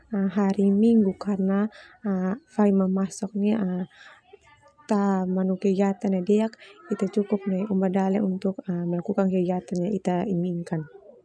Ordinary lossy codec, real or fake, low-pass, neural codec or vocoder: none; real; none; none